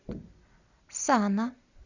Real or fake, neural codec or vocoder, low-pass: real; none; 7.2 kHz